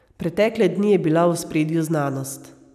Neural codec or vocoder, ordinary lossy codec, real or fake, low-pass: none; none; real; 14.4 kHz